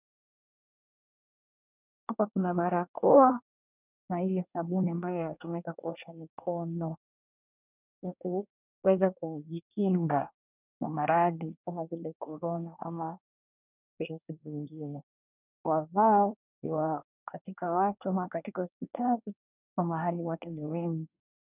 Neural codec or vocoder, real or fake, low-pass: codec, 24 kHz, 1 kbps, SNAC; fake; 3.6 kHz